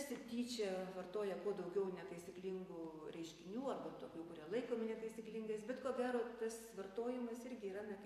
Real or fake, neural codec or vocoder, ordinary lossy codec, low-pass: fake; vocoder, 44.1 kHz, 128 mel bands every 256 samples, BigVGAN v2; AAC, 64 kbps; 14.4 kHz